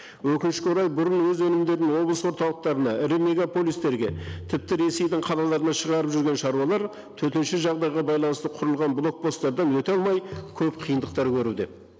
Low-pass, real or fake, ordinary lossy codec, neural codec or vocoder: none; real; none; none